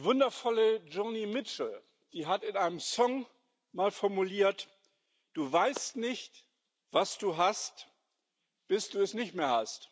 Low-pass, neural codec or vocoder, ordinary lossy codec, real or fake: none; none; none; real